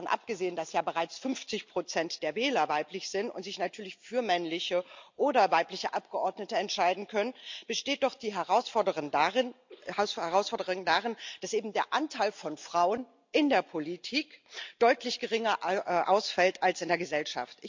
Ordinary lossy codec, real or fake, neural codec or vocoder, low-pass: MP3, 64 kbps; real; none; 7.2 kHz